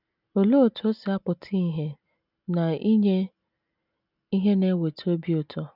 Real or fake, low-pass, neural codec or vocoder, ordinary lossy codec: real; 5.4 kHz; none; none